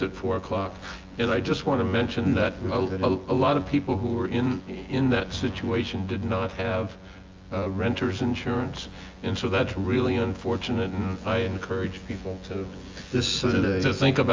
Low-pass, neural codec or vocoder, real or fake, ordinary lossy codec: 7.2 kHz; vocoder, 24 kHz, 100 mel bands, Vocos; fake; Opus, 32 kbps